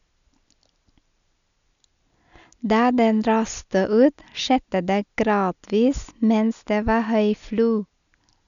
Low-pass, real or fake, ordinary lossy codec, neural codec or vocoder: 7.2 kHz; real; none; none